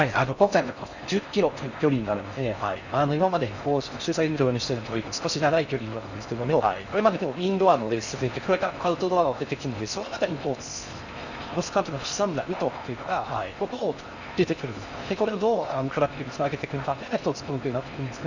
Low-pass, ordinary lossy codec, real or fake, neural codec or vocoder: 7.2 kHz; none; fake; codec, 16 kHz in and 24 kHz out, 0.6 kbps, FocalCodec, streaming, 4096 codes